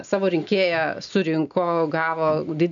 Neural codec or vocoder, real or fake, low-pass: none; real; 7.2 kHz